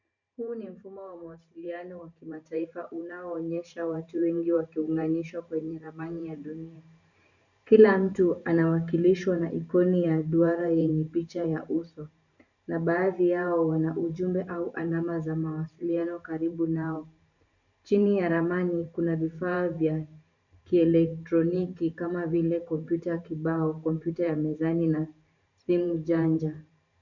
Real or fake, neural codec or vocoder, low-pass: fake; vocoder, 44.1 kHz, 128 mel bands every 512 samples, BigVGAN v2; 7.2 kHz